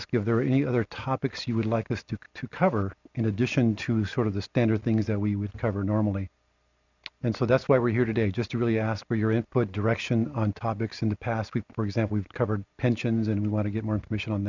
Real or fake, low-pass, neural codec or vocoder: real; 7.2 kHz; none